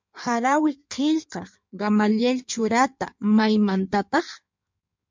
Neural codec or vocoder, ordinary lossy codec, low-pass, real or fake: codec, 16 kHz in and 24 kHz out, 1.1 kbps, FireRedTTS-2 codec; MP3, 64 kbps; 7.2 kHz; fake